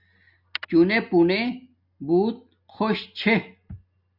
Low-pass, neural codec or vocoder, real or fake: 5.4 kHz; none; real